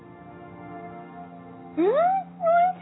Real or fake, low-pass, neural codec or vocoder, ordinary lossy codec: real; 7.2 kHz; none; AAC, 16 kbps